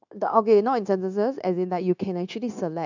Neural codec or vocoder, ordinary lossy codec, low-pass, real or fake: codec, 16 kHz, 0.9 kbps, LongCat-Audio-Codec; none; 7.2 kHz; fake